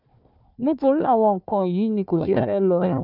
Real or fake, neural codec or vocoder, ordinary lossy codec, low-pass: fake; codec, 16 kHz, 1 kbps, FunCodec, trained on Chinese and English, 50 frames a second; none; 5.4 kHz